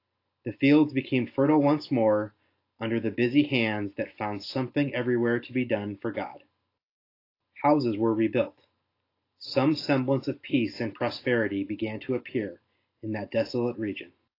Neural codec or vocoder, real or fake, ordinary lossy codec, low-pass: none; real; AAC, 32 kbps; 5.4 kHz